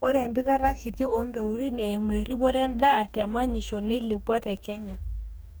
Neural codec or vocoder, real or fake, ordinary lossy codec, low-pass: codec, 44.1 kHz, 2.6 kbps, DAC; fake; none; none